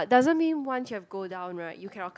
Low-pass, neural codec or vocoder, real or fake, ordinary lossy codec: none; none; real; none